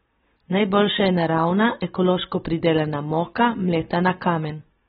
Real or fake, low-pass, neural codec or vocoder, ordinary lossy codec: real; 9.9 kHz; none; AAC, 16 kbps